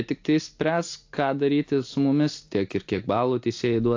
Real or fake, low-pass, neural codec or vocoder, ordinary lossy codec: real; 7.2 kHz; none; AAC, 48 kbps